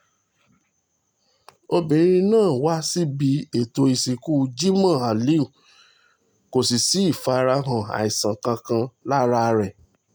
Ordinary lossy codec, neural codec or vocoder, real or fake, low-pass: none; none; real; none